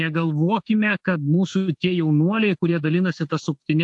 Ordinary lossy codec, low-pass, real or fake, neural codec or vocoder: AAC, 64 kbps; 10.8 kHz; fake; autoencoder, 48 kHz, 32 numbers a frame, DAC-VAE, trained on Japanese speech